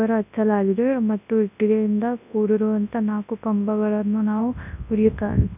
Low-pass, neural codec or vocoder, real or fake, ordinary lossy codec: 3.6 kHz; codec, 24 kHz, 0.9 kbps, WavTokenizer, large speech release; fake; none